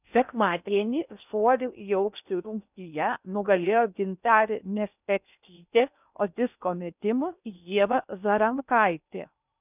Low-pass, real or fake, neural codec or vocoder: 3.6 kHz; fake; codec, 16 kHz in and 24 kHz out, 0.6 kbps, FocalCodec, streaming, 4096 codes